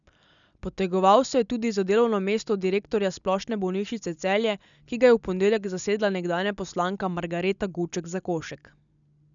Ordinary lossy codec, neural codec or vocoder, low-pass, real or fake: none; none; 7.2 kHz; real